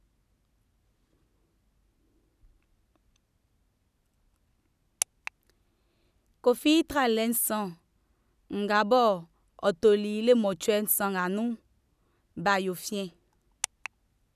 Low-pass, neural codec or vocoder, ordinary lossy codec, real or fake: 14.4 kHz; none; none; real